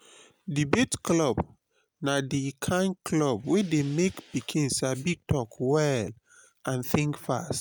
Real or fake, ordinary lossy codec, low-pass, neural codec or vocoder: real; none; none; none